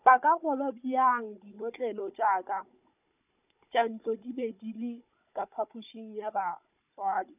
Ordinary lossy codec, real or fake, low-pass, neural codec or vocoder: none; fake; 3.6 kHz; codec, 16 kHz, 16 kbps, FunCodec, trained on Chinese and English, 50 frames a second